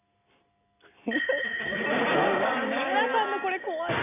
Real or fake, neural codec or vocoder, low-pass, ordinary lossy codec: real; none; 3.6 kHz; none